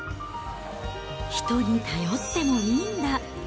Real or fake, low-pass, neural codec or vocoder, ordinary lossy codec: real; none; none; none